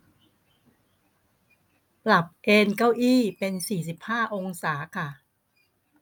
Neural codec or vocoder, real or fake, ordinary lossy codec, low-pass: none; real; none; none